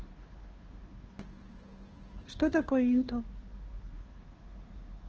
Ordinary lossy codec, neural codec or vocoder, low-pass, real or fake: Opus, 16 kbps; codec, 16 kHz, 2 kbps, FunCodec, trained on Chinese and English, 25 frames a second; 7.2 kHz; fake